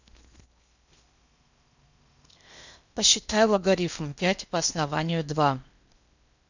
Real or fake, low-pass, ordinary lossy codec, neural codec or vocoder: fake; 7.2 kHz; none; codec, 16 kHz in and 24 kHz out, 0.8 kbps, FocalCodec, streaming, 65536 codes